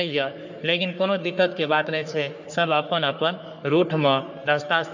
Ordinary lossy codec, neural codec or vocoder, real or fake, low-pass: none; codec, 44.1 kHz, 3.4 kbps, Pupu-Codec; fake; 7.2 kHz